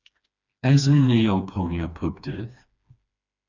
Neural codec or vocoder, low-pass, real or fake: codec, 16 kHz, 2 kbps, FreqCodec, smaller model; 7.2 kHz; fake